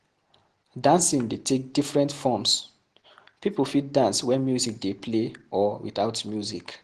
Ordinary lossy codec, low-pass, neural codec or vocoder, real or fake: Opus, 16 kbps; 10.8 kHz; none; real